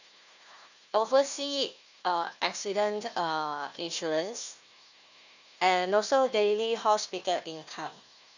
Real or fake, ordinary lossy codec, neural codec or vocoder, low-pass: fake; none; codec, 16 kHz, 1 kbps, FunCodec, trained on Chinese and English, 50 frames a second; 7.2 kHz